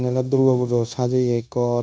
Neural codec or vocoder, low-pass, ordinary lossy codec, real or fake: codec, 16 kHz, 0.9 kbps, LongCat-Audio-Codec; none; none; fake